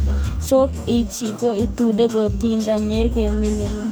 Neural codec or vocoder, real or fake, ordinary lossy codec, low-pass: codec, 44.1 kHz, 2.6 kbps, DAC; fake; none; none